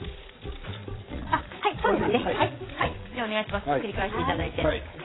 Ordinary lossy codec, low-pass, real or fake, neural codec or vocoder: AAC, 16 kbps; 7.2 kHz; fake; vocoder, 22.05 kHz, 80 mel bands, Vocos